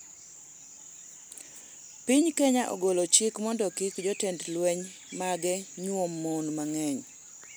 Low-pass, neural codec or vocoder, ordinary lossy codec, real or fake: none; none; none; real